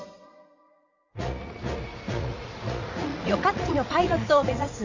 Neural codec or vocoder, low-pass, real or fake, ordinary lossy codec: vocoder, 44.1 kHz, 80 mel bands, Vocos; 7.2 kHz; fake; Opus, 64 kbps